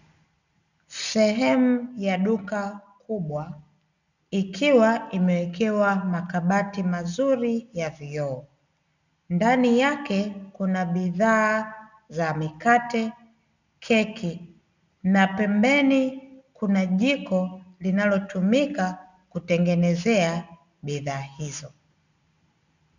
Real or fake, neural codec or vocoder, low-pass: real; none; 7.2 kHz